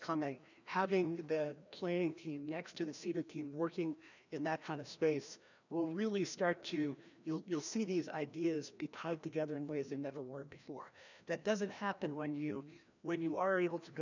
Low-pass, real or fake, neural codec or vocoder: 7.2 kHz; fake; codec, 16 kHz, 1 kbps, FreqCodec, larger model